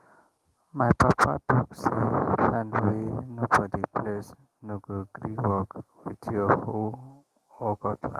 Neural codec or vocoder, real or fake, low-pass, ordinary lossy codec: autoencoder, 48 kHz, 128 numbers a frame, DAC-VAE, trained on Japanese speech; fake; 14.4 kHz; Opus, 24 kbps